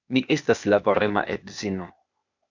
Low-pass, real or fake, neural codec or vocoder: 7.2 kHz; fake; codec, 16 kHz, 0.8 kbps, ZipCodec